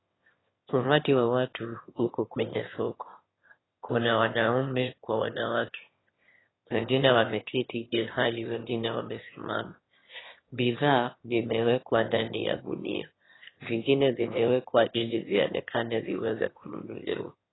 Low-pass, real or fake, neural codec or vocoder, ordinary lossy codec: 7.2 kHz; fake; autoencoder, 22.05 kHz, a latent of 192 numbers a frame, VITS, trained on one speaker; AAC, 16 kbps